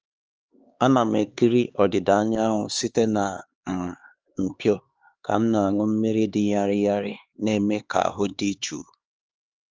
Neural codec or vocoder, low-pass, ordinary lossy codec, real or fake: codec, 16 kHz, 4 kbps, X-Codec, HuBERT features, trained on LibriSpeech; 7.2 kHz; Opus, 24 kbps; fake